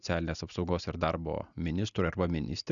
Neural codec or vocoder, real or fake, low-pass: none; real; 7.2 kHz